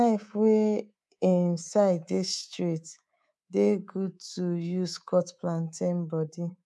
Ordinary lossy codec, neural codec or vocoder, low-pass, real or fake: none; codec, 24 kHz, 3.1 kbps, DualCodec; none; fake